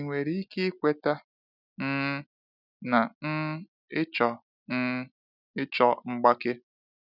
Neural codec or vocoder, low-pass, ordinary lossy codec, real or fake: none; 5.4 kHz; none; real